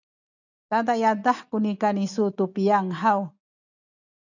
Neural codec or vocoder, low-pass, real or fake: none; 7.2 kHz; real